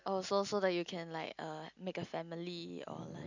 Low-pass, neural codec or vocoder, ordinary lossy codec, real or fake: 7.2 kHz; none; none; real